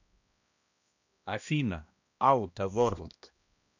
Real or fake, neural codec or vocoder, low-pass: fake; codec, 16 kHz, 1 kbps, X-Codec, HuBERT features, trained on balanced general audio; 7.2 kHz